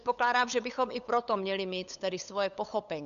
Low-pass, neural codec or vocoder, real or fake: 7.2 kHz; codec, 16 kHz, 8 kbps, FunCodec, trained on LibriTTS, 25 frames a second; fake